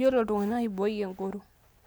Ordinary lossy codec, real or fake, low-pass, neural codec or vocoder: none; real; none; none